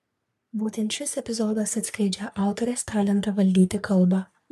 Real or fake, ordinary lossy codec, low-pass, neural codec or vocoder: fake; MP3, 96 kbps; 14.4 kHz; codec, 44.1 kHz, 3.4 kbps, Pupu-Codec